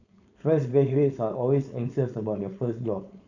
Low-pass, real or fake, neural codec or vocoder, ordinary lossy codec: 7.2 kHz; fake; codec, 16 kHz, 4.8 kbps, FACodec; none